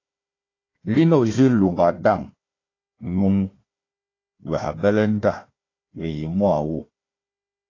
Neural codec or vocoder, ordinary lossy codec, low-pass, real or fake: codec, 16 kHz, 1 kbps, FunCodec, trained on Chinese and English, 50 frames a second; AAC, 32 kbps; 7.2 kHz; fake